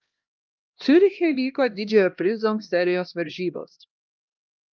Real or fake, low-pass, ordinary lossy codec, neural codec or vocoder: fake; 7.2 kHz; Opus, 24 kbps; codec, 16 kHz, 1 kbps, X-Codec, HuBERT features, trained on LibriSpeech